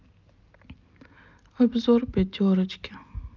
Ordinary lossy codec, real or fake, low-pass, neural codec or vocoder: Opus, 24 kbps; real; 7.2 kHz; none